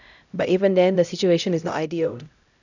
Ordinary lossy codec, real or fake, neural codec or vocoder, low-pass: none; fake; codec, 16 kHz, 0.5 kbps, X-Codec, HuBERT features, trained on LibriSpeech; 7.2 kHz